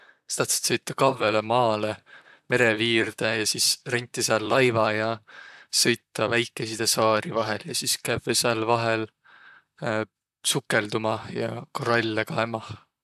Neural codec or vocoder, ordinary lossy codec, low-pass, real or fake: vocoder, 44.1 kHz, 128 mel bands, Pupu-Vocoder; AAC, 96 kbps; 14.4 kHz; fake